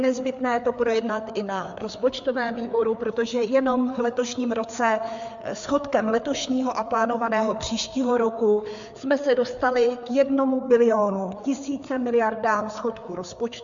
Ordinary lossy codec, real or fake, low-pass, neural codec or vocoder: MP3, 64 kbps; fake; 7.2 kHz; codec, 16 kHz, 4 kbps, FreqCodec, larger model